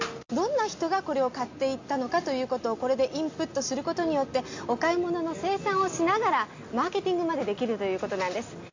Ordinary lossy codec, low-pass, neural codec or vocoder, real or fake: AAC, 48 kbps; 7.2 kHz; none; real